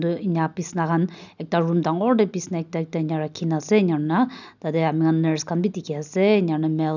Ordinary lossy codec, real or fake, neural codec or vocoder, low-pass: none; real; none; 7.2 kHz